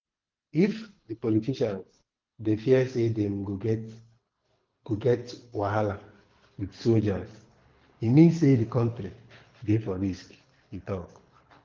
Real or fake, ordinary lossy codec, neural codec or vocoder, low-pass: fake; Opus, 32 kbps; codec, 24 kHz, 6 kbps, HILCodec; 7.2 kHz